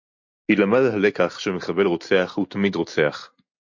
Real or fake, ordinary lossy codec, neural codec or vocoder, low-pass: real; MP3, 48 kbps; none; 7.2 kHz